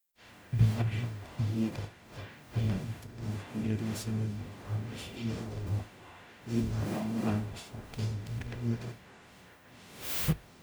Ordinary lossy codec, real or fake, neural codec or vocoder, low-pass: none; fake; codec, 44.1 kHz, 0.9 kbps, DAC; none